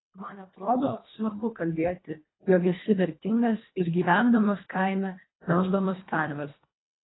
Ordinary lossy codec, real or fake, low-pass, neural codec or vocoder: AAC, 16 kbps; fake; 7.2 kHz; codec, 24 kHz, 1.5 kbps, HILCodec